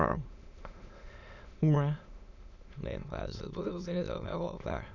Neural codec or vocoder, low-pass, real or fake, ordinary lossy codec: autoencoder, 22.05 kHz, a latent of 192 numbers a frame, VITS, trained on many speakers; 7.2 kHz; fake; none